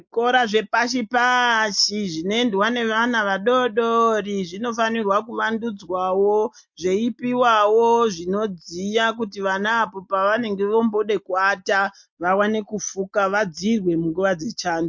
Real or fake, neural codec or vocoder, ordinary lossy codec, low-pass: real; none; MP3, 48 kbps; 7.2 kHz